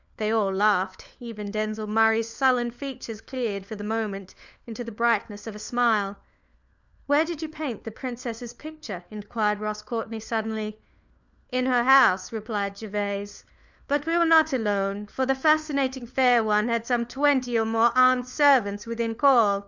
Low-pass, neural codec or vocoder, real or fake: 7.2 kHz; codec, 16 kHz, 4 kbps, FunCodec, trained on LibriTTS, 50 frames a second; fake